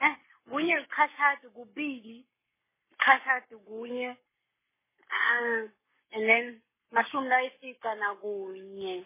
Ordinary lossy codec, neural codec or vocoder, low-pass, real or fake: MP3, 16 kbps; codec, 44.1 kHz, 7.8 kbps, Pupu-Codec; 3.6 kHz; fake